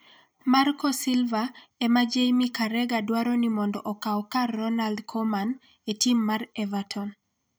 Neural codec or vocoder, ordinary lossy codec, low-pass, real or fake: vocoder, 44.1 kHz, 128 mel bands every 256 samples, BigVGAN v2; none; none; fake